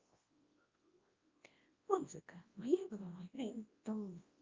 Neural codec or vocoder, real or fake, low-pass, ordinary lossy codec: codec, 24 kHz, 0.9 kbps, WavTokenizer, large speech release; fake; 7.2 kHz; Opus, 32 kbps